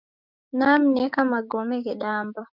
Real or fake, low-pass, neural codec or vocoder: fake; 5.4 kHz; codec, 16 kHz, 6 kbps, DAC